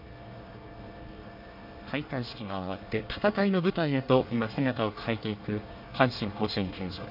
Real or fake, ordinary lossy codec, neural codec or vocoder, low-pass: fake; MP3, 48 kbps; codec, 24 kHz, 1 kbps, SNAC; 5.4 kHz